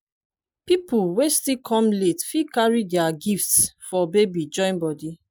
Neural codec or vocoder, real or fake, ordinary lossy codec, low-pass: none; real; none; none